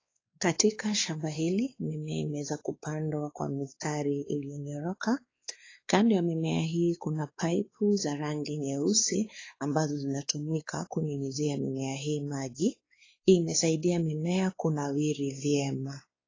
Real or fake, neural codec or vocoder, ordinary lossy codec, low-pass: fake; codec, 16 kHz, 4 kbps, X-Codec, WavLM features, trained on Multilingual LibriSpeech; AAC, 32 kbps; 7.2 kHz